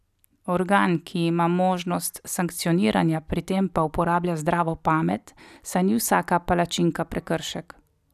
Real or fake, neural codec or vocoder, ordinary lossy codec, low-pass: real; none; none; 14.4 kHz